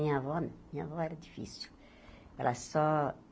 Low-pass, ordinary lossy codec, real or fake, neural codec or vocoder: none; none; real; none